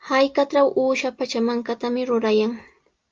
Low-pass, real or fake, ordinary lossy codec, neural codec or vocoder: 7.2 kHz; real; Opus, 24 kbps; none